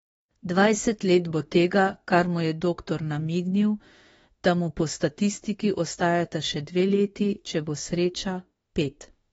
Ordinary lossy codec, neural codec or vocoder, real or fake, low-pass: AAC, 24 kbps; autoencoder, 48 kHz, 32 numbers a frame, DAC-VAE, trained on Japanese speech; fake; 19.8 kHz